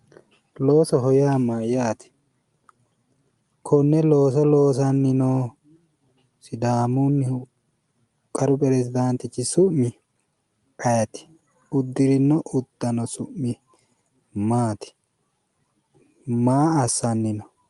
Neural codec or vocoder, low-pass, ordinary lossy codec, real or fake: none; 10.8 kHz; Opus, 32 kbps; real